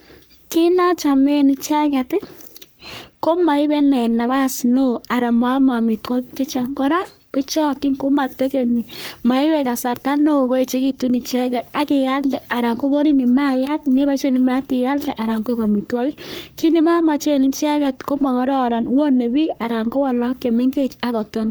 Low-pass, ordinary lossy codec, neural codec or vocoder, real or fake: none; none; codec, 44.1 kHz, 3.4 kbps, Pupu-Codec; fake